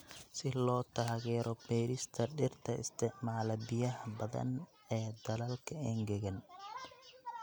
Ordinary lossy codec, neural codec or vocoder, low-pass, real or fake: none; none; none; real